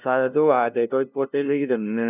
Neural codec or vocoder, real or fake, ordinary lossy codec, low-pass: codec, 16 kHz, 0.5 kbps, FunCodec, trained on LibriTTS, 25 frames a second; fake; none; 3.6 kHz